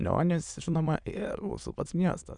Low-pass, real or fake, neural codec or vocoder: 9.9 kHz; fake; autoencoder, 22.05 kHz, a latent of 192 numbers a frame, VITS, trained on many speakers